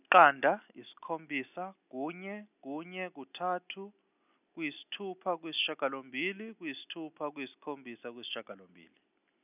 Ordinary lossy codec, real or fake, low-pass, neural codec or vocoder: none; real; 3.6 kHz; none